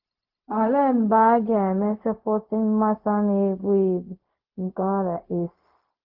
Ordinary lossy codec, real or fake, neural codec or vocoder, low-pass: Opus, 24 kbps; fake; codec, 16 kHz, 0.4 kbps, LongCat-Audio-Codec; 5.4 kHz